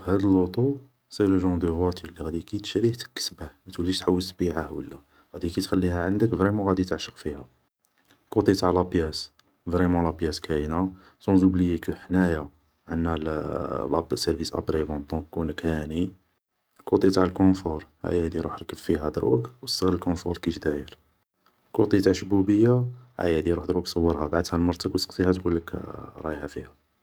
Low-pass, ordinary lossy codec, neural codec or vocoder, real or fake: none; none; codec, 44.1 kHz, 7.8 kbps, DAC; fake